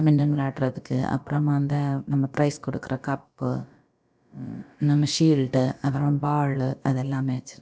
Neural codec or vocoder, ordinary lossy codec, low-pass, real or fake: codec, 16 kHz, about 1 kbps, DyCAST, with the encoder's durations; none; none; fake